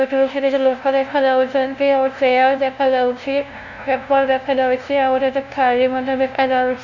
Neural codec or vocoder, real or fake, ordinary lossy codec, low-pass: codec, 16 kHz, 0.5 kbps, FunCodec, trained on LibriTTS, 25 frames a second; fake; none; 7.2 kHz